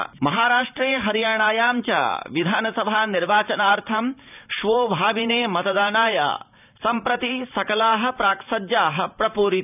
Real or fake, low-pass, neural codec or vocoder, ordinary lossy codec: fake; 3.6 kHz; vocoder, 44.1 kHz, 128 mel bands every 512 samples, BigVGAN v2; none